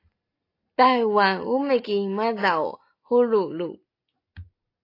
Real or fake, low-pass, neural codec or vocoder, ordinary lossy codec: real; 5.4 kHz; none; AAC, 32 kbps